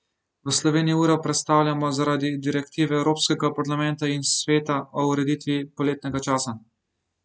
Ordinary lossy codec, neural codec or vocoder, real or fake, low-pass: none; none; real; none